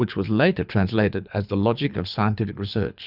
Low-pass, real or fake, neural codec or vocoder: 5.4 kHz; fake; codec, 24 kHz, 6 kbps, HILCodec